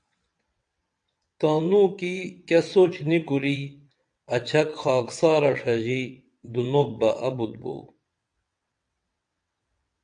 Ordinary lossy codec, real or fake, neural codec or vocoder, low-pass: AAC, 64 kbps; fake; vocoder, 22.05 kHz, 80 mel bands, WaveNeXt; 9.9 kHz